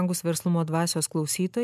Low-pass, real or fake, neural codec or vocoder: 14.4 kHz; real; none